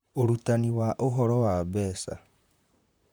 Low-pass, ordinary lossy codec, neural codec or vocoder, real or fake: none; none; vocoder, 44.1 kHz, 128 mel bands, Pupu-Vocoder; fake